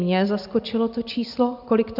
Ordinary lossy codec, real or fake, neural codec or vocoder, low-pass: AAC, 48 kbps; real; none; 5.4 kHz